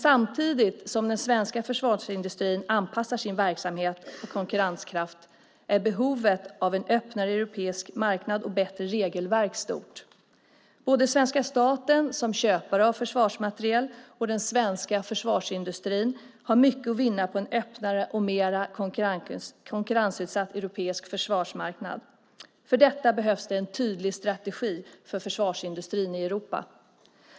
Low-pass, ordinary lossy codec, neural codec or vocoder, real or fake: none; none; none; real